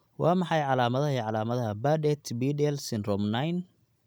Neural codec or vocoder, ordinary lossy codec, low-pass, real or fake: none; none; none; real